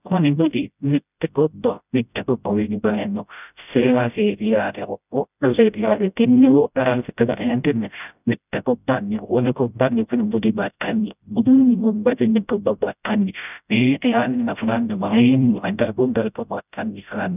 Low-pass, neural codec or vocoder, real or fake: 3.6 kHz; codec, 16 kHz, 0.5 kbps, FreqCodec, smaller model; fake